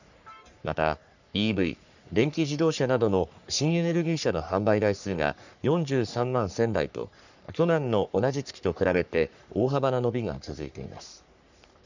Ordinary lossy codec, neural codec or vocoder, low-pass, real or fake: none; codec, 44.1 kHz, 3.4 kbps, Pupu-Codec; 7.2 kHz; fake